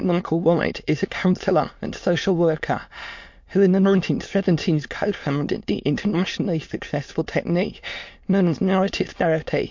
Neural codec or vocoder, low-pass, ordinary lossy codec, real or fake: autoencoder, 22.05 kHz, a latent of 192 numbers a frame, VITS, trained on many speakers; 7.2 kHz; MP3, 48 kbps; fake